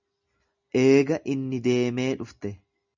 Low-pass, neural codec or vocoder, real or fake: 7.2 kHz; none; real